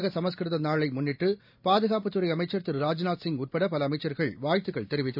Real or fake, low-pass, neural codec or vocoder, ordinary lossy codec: real; 5.4 kHz; none; none